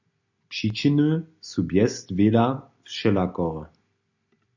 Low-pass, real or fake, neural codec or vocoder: 7.2 kHz; real; none